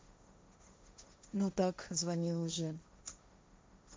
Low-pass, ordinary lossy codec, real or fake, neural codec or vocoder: none; none; fake; codec, 16 kHz, 1.1 kbps, Voila-Tokenizer